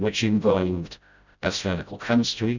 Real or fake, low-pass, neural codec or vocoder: fake; 7.2 kHz; codec, 16 kHz, 0.5 kbps, FreqCodec, smaller model